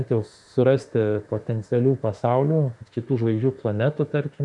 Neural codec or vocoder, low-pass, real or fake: autoencoder, 48 kHz, 32 numbers a frame, DAC-VAE, trained on Japanese speech; 10.8 kHz; fake